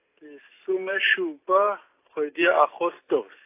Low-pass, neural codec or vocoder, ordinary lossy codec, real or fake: 3.6 kHz; none; AAC, 24 kbps; real